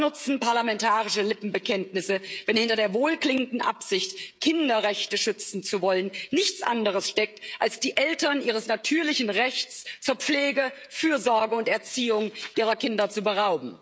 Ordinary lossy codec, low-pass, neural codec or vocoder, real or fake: none; none; codec, 16 kHz, 16 kbps, FreqCodec, smaller model; fake